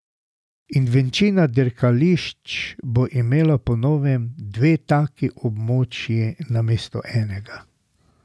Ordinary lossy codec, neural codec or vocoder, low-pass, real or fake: none; none; none; real